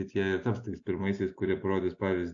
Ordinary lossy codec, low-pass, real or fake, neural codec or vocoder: AAC, 48 kbps; 7.2 kHz; real; none